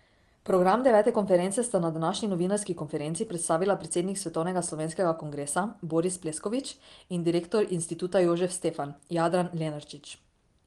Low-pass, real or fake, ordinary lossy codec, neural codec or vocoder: 10.8 kHz; real; Opus, 24 kbps; none